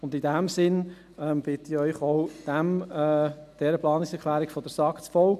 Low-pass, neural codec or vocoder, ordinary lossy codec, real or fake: 14.4 kHz; none; none; real